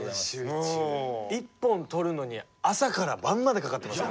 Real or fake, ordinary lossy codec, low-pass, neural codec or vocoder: real; none; none; none